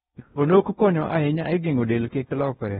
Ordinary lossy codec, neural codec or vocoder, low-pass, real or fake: AAC, 16 kbps; codec, 16 kHz in and 24 kHz out, 0.6 kbps, FocalCodec, streaming, 4096 codes; 10.8 kHz; fake